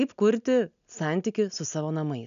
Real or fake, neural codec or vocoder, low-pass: real; none; 7.2 kHz